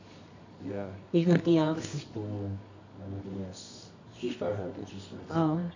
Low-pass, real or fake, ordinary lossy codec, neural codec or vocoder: 7.2 kHz; fake; AAC, 48 kbps; codec, 24 kHz, 0.9 kbps, WavTokenizer, medium music audio release